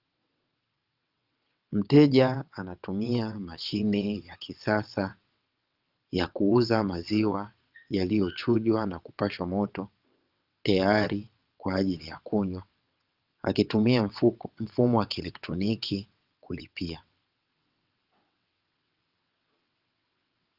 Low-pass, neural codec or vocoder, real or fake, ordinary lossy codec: 5.4 kHz; vocoder, 22.05 kHz, 80 mel bands, WaveNeXt; fake; Opus, 24 kbps